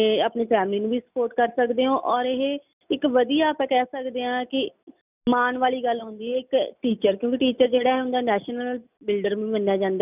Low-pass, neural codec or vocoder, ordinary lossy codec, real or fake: 3.6 kHz; none; none; real